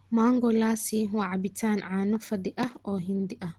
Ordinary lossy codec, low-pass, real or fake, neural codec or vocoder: Opus, 16 kbps; 19.8 kHz; real; none